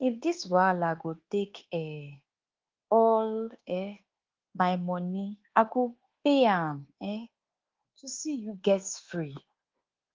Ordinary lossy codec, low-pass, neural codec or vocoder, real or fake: Opus, 16 kbps; 7.2 kHz; codec, 24 kHz, 1.2 kbps, DualCodec; fake